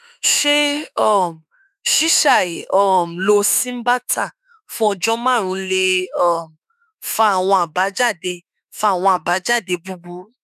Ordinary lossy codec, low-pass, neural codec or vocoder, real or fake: none; 14.4 kHz; autoencoder, 48 kHz, 32 numbers a frame, DAC-VAE, trained on Japanese speech; fake